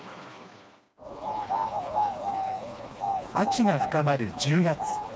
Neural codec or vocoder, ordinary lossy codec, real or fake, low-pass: codec, 16 kHz, 2 kbps, FreqCodec, smaller model; none; fake; none